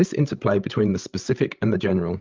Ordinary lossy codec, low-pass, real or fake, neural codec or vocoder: Opus, 32 kbps; 7.2 kHz; fake; codec, 16 kHz, 16 kbps, FreqCodec, larger model